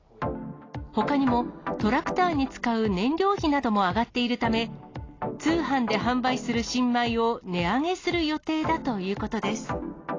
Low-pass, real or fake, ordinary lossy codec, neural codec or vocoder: 7.2 kHz; real; AAC, 32 kbps; none